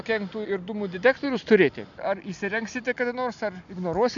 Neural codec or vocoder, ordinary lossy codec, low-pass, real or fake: none; MP3, 96 kbps; 7.2 kHz; real